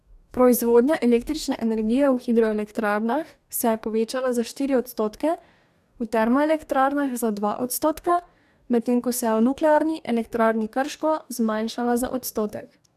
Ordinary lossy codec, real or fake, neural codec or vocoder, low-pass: none; fake; codec, 44.1 kHz, 2.6 kbps, DAC; 14.4 kHz